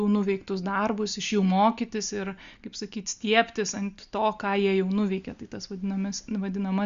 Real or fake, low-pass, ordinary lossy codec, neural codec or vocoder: real; 7.2 kHz; Opus, 64 kbps; none